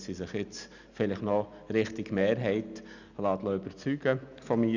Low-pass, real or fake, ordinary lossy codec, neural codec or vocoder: 7.2 kHz; real; none; none